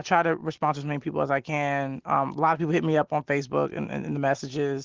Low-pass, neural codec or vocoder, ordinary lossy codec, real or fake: 7.2 kHz; none; Opus, 16 kbps; real